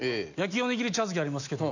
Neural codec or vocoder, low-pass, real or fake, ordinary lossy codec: none; 7.2 kHz; real; none